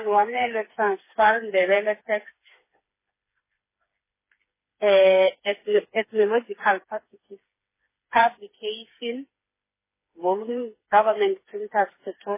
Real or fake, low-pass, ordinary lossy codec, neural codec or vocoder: fake; 3.6 kHz; MP3, 16 kbps; codec, 16 kHz, 4 kbps, FreqCodec, smaller model